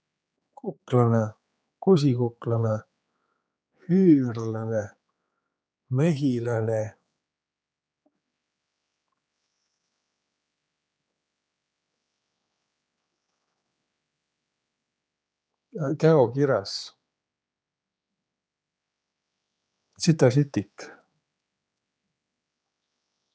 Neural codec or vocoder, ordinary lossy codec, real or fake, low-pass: codec, 16 kHz, 4 kbps, X-Codec, HuBERT features, trained on general audio; none; fake; none